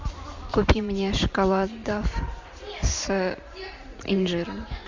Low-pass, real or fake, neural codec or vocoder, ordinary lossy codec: 7.2 kHz; fake; vocoder, 44.1 kHz, 128 mel bands every 512 samples, BigVGAN v2; MP3, 64 kbps